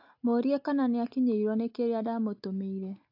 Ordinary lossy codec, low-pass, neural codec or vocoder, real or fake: AAC, 48 kbps; 5.4 kHz; none; real